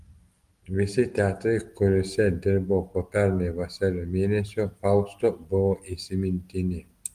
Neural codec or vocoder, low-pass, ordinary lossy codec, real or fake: none; 14.4 kHz; Opus, 24 kbps; real